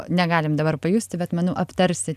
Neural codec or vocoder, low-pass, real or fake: none; 14.4 kHz; real